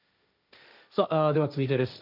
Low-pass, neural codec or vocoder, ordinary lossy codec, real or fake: 5.4 kHz; codec, 16 kHz, 1.1 kbps, Voila-Tokenizer; none; fake